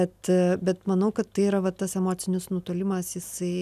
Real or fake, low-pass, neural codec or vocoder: real; 14.4 kHz; none